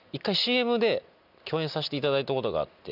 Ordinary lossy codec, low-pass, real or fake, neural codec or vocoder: none; 5.4 kHz; real; none